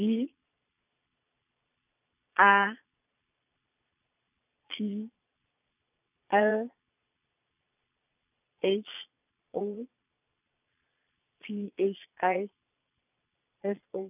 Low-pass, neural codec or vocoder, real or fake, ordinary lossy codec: 3.6 kHz; vocoder, 22.05 kHz, 80 mel bands, Vocos; fake; none